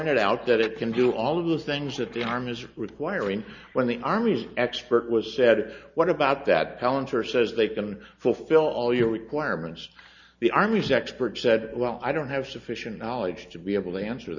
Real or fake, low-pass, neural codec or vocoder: real; 7.2 kHz; none